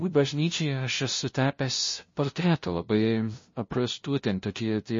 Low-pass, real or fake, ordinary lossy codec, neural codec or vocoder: 7.2 kHz; fake; MP3, 32 kbps; codec, 16 kHz, 0.5 kbps, FunCodec, trained on LibriTTS, 25 frames a second